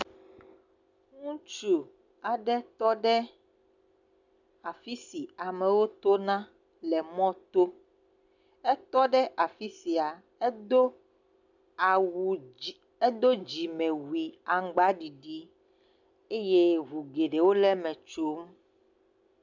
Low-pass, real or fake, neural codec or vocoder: 7.2 kHz; real; none